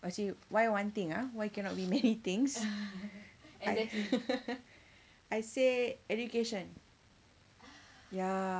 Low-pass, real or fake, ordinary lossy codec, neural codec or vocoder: none; real; none; none